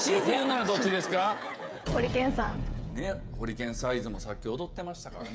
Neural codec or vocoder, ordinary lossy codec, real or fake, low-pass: codec, 16 kHz, 16 kbps, FreqCodec, smaller model; none; fake; none